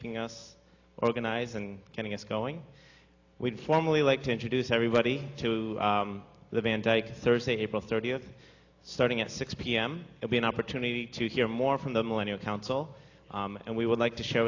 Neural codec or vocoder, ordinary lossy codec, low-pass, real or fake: none; AAC, 48 kbps; 7.2 kHz; real